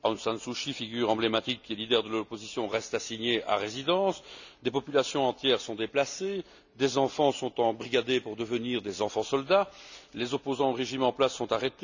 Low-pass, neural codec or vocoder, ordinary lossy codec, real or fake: 7.2 kHz; none; none; real